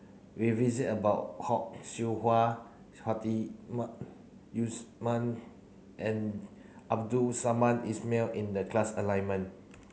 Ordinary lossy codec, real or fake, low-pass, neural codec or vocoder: none; real; none; none